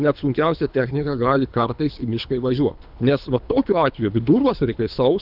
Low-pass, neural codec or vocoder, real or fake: 5.4 kHz; codec, 24 kHz, 3 kbps, HILCodec; fake